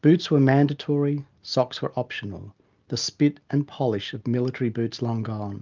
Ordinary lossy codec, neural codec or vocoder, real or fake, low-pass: Opus, 32 kbps; none; real; 7.2 kHz